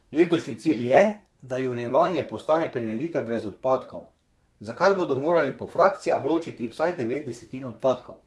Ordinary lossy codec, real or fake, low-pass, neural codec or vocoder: none; fake; none; codec, 24 kHz, 1 kbps, SNAC